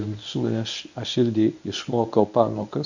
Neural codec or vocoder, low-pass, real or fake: codec, 24 kHz, 0.9 kbps, WavTokenizer, medium speech release version 1; 7.2 kHz; fake